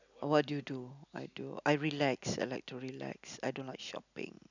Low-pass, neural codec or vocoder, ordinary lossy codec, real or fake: 7.2 kHz; none; none; real